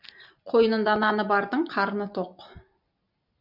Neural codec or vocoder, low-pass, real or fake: none; 5.4 kHz; real